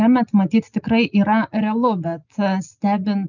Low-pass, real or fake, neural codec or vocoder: 7.2 kHz; real; none